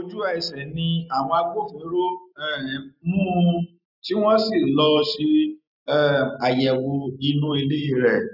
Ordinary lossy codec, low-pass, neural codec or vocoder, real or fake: none; 5.4 kHz; none; real